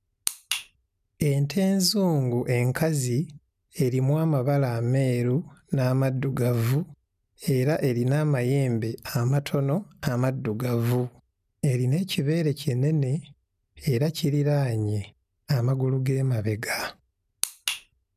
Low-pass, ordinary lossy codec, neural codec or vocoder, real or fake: 14.4 kHz; none; none; real